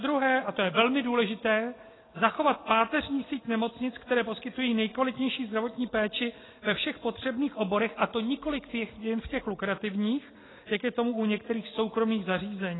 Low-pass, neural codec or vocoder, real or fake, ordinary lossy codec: 7.2 kHz; none; real; AAC, 16 kbps